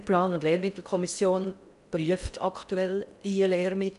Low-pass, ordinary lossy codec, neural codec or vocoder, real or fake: 10.8 kHz; none; codec, 16 kHz in and 24 kHz out, 0.6 kbps, FocalCodec, streaming, 4096 codes; fake